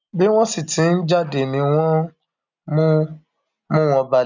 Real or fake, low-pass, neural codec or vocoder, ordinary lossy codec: real; 7.2 kHz; none; none